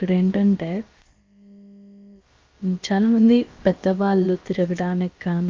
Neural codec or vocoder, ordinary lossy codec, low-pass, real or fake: codec, 16 kHz, about 1 kbps, DyCAST, with the encoder's durations; Opus, 32 kbps; 7.2 kHz; fake